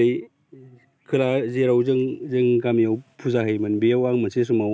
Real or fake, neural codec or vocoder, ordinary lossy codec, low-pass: real; none; none; none